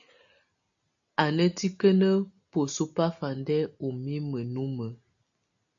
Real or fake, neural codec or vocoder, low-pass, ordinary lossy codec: real; none; 7.2 kHz; MP3, 64 kbps